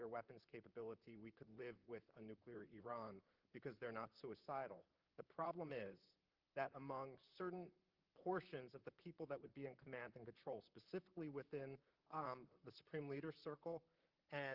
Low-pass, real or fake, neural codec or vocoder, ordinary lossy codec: 5.4 kHz; fake; vocoder, 44.1 kHz, 128 mel bands, Pupu-Vocoder; Opus, 32 kbps